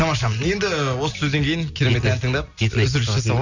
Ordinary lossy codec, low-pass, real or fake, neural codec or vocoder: none; 7.2 kHz; real; none